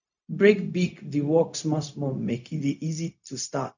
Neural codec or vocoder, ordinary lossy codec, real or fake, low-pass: codec, 16 kHz, 0.4 kbps, LongCat-Audio-Codec; none; fake; 7.2 kHz